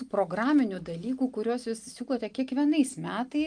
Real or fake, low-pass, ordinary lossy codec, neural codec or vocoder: real; 9.9 kHz; Opus, 32 kbps; none